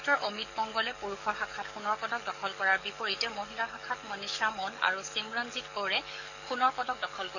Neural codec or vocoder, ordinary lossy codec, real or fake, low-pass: codec, 44.1 kHz, 7.8 kbps, DAC; none; fake; 7.2 kHz